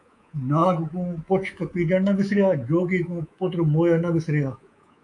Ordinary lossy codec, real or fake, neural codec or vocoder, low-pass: AAC, 64 kbps; fake; codec, 24 kHz, 3.1 kbps, DualCodec; 10.8 kHz